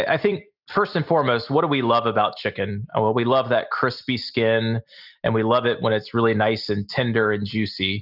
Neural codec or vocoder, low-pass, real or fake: none; 5.4 kHz; real